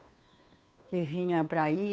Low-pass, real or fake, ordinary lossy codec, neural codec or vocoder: none; fake; none; codec, 16 kHz, 2 kbps, FunCodec, trained on Chinese and English, 25 frames a second